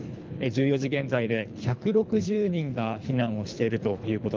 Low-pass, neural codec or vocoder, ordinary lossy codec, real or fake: 7.2 kHz; codec, 24 kHz, 3 kbps, HILCodec; Opus, 24 kbps; fake